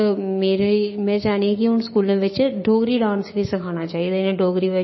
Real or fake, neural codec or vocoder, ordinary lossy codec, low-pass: fake; codec, 16 kHz, 6 kbps, DAC; MP3, 24 kbps; 7.2 kHz